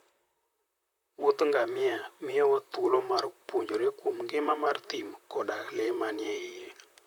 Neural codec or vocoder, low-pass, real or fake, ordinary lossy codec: vocoder, 44.1 kHz, 128 mel bands, Pupu-Vocoder; 19.8 kHz; fake; none